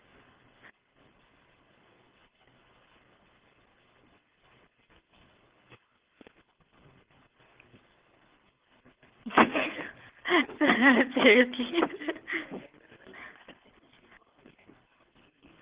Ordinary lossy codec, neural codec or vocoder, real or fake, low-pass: Opus, 16 kbps; codec, 24 kHz, 6 kbps, HILCodec; fake; 3.6 kHz